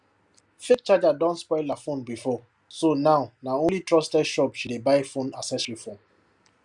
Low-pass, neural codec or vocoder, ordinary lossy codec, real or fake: 10.8 kHz; none; Opus, 64 kbps; real